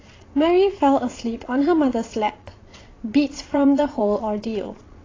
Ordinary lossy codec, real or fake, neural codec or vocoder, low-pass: AAC, 32 kbps; fake; vocoder, 22.05 kHz, 80 mel bands, Vocos; 7.2 kHz